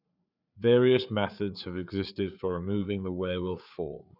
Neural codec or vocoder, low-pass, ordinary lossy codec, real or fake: codec, 16 kHz, 4 kbps, FreqCodec, larger model; 5.4 kHz; none; fake